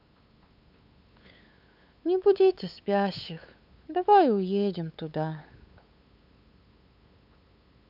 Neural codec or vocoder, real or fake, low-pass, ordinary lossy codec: codec, 16 kHz, 8 kbps, FunCodec, trained on LibriTTS, 25 frames a second; fake; 5.4 kHz; none